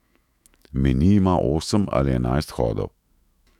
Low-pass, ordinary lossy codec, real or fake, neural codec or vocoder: 19.8 kHz; none; fake; autoencoder, 48 kHz, 128 numbers a frame, DAC-VAE, trained on Japanese speech